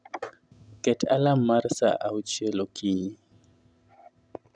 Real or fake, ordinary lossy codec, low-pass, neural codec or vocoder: real; none; 9.9 kHz; none